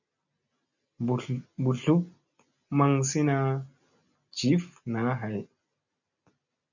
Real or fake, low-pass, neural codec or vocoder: real; 7.2 kHz; none